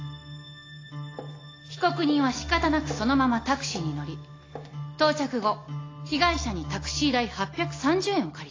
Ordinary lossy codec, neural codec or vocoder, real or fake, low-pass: AAC, 32 kbps; none; real; 7.2 kHz